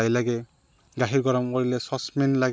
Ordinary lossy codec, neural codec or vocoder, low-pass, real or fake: none; none; none; real